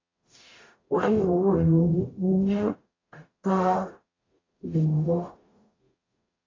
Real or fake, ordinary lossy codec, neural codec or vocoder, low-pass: fake; AAC, 32 kbps; codec, 44.1 kHz, 0.9 kbps, DAC; 7.2 kHz